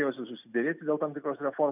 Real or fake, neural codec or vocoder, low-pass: real; none; 3.6 kHz